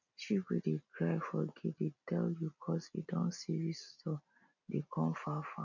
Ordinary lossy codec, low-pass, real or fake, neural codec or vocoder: none; 7.2 kHz; real; none